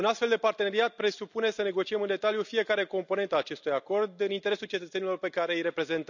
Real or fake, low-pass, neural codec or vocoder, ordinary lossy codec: real; 7.2 kHz; none; none